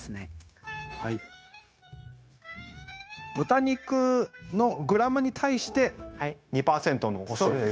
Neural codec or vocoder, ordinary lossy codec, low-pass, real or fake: codec, 16 kHz, 0.9 kbps, LongCat-Audio-Codec; none; none; fake